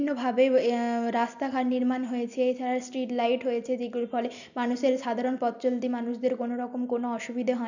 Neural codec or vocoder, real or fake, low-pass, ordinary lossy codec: none; real; 7.2 kHz; none